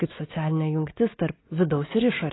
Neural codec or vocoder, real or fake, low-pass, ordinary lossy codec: none; real; 7.2 kHz; AAC, 16 kbps